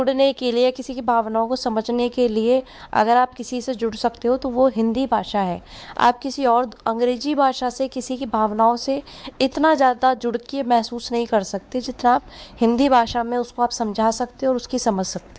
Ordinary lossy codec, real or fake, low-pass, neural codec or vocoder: none; fake; none; codec, 16 kHz, 4 kbps, X-Codec, WavLM features, trained on Multilingual LibriSpeech